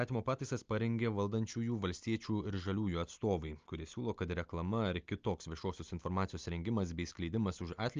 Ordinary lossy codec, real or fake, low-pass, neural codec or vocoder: Opus, 24 kbps; real; 7.2 kHz; none